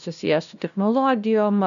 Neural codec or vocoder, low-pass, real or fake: codec, 16 kHz, 0.5 kbps, FunCodec, trained on LibriTTS, 25 frames a second; 7.2 kHz; fake